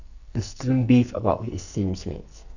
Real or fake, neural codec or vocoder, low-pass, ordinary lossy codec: fake; codec, 44.1 kHz, 2.6 kbps, SNAC; 7.2 kHz; none